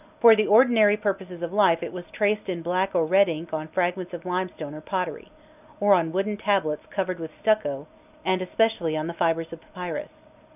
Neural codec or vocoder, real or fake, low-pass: none; real; 3.6 kHz